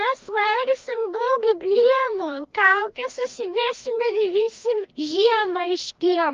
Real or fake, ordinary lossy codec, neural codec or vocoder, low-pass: fake; Opus, 32 kbps; codec, 16 kHz, 1 kbps, FreqCodec, larger model; 7.2 kHz